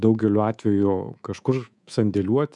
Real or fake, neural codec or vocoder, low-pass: fake; codec, 24 kHz, 3.1 kbps, DualCodec; 10.8 kHz